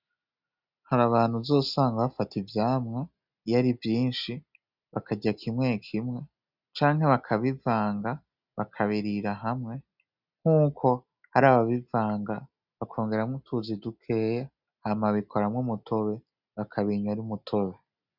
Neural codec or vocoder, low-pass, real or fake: none; 5.4 kHz; real